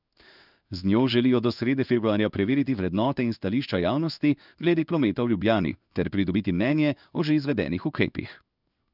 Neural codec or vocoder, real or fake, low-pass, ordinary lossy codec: codec, 16 kHz in and 24 kHz out, 1 kbps, XY-Tokenizer; fake; 5.4 kHz; none